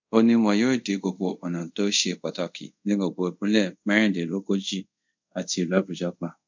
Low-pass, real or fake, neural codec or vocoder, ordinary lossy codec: 7.2 kHz; fake; codec, 24 kHz, 0.5 kbps, DualCodec; MP3, 64 kbps